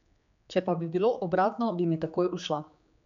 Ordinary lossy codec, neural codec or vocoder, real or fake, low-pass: MP3, 64 kbps; codec, 16 kHz, 4 kbps, X-Codec, HuBERT features, trained on general audio; fake; 7.2 kHz